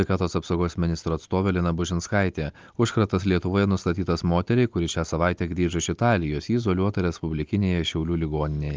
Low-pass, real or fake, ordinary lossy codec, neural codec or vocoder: 7.2 kHz; real; Opus, 24 kbps; none